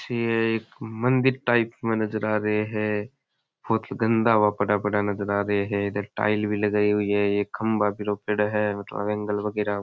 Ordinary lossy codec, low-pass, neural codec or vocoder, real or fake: none; none; none; real